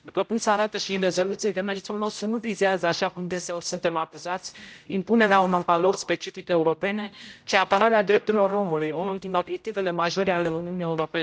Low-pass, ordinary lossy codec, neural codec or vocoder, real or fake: none; none; codec, 16 kHz, 0.5 kbps, X-Codec, HuBERT features, trained on general audio; fake